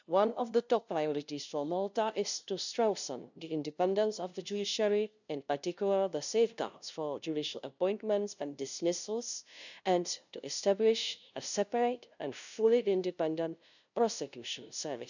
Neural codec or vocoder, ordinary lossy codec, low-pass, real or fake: codec, 16 kHz, 0.5 kbps, FunCodec, trained on LibriTTS, 25 frames a second; none; 7.2 kHz; fake